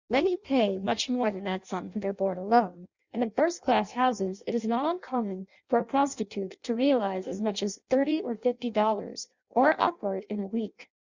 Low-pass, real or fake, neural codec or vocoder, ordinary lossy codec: 7.2 kHz; fake; codec, 16 kHz in and 24 kHz out, 0.6 kbps, FireRedTTS-2 codec; Opus, 64 kbps